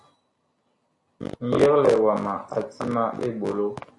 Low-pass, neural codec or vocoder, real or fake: 10.8 kHz; none; real